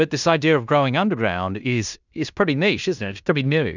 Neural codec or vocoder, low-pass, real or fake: codec, 16 kHz in and 24 kHz out, 0.9 kbps, LongCat-Audio-Codec, fine tuned four codebook decoder; 7.2 kHz; fake